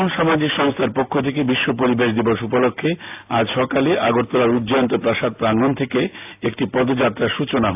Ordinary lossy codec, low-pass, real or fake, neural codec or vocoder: AAC, 32 kbps; 3.6 kHz; real; none